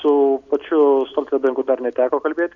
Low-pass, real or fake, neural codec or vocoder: 7.2 kHz; real; none